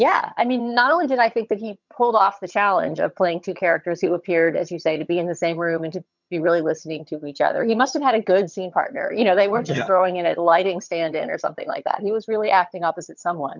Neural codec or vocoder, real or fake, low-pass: vocoder, 22.05 kHz, 80 mel bands, HiFi-GAN; fake; 7.2 kHz